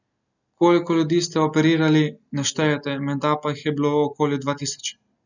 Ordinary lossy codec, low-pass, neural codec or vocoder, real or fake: none; 7.2 kHz; none; real